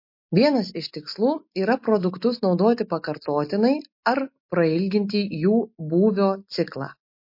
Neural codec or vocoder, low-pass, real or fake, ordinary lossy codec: none; 5.4 kHz; real; MP3, 32 kbps